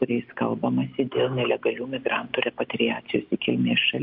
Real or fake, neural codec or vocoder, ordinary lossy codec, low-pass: real; none; MP3, 48 kbps; 5.4 kHz